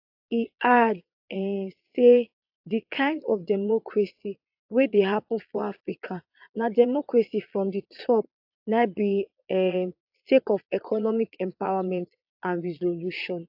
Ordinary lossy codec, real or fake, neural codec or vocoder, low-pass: AAC, 48 kbps; fake; vocoder, 22.05 kHz, 80 mel bands, Vocos; 5.4 kHz